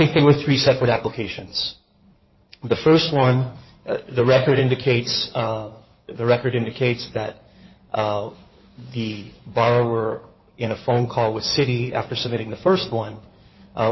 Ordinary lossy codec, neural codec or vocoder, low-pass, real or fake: MP3, 24 kbps; codec, 16 kHz in and 24 kHz out, 2.2 kbps, FireRedTTS-2 codec; 7.2 kHz; fake